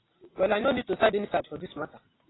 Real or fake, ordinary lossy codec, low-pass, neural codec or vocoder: real; AAC, 16 kbps; 7.2 kHz; none